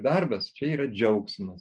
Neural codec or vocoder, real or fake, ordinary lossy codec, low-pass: none; real; MP3, 96 kbps; 9.9 kHz